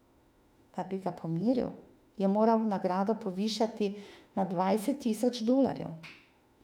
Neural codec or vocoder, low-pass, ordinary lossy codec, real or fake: autoencoder, 48 kHz, 32 numbers a frame, DAC-VAE, trained on Japanese speech; 19.8 kHz; none; fake